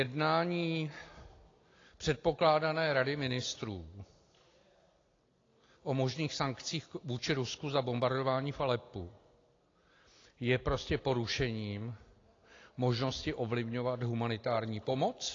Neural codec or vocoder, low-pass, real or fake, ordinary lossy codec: none; 7.2 kHz; real; AAC, 32 kbps